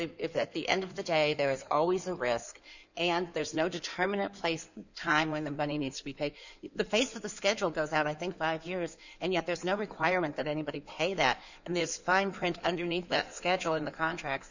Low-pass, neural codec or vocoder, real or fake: 7.2 kHz; codec, 16 kHz in and 24 kHz out, 2.2 kbps, FireRedTTS-2 codec; fake